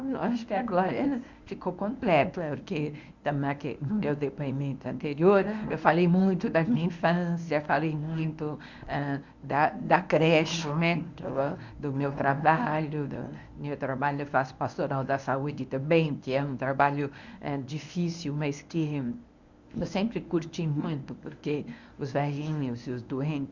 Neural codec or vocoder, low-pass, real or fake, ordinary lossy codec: codec, 24 kHz, 0.9 kbps, WavTokenizer, medium speech release version 1; 7.2 kHz; fake; Opus, 64 kbps